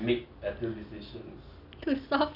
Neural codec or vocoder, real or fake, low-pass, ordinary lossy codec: none; real; 5.4 kHz; none